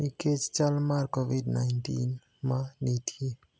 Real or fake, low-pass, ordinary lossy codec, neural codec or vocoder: real; none; none; none